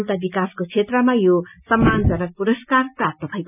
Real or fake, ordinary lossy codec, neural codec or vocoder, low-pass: real; none; none; 3.6 kHz